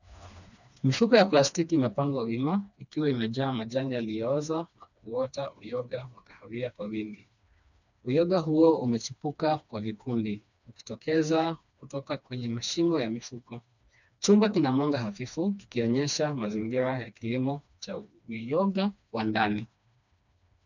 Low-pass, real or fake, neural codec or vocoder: 7.2 kHz; fake; codec, 16 kHz, 2 kbps, FreqCodec, smaller model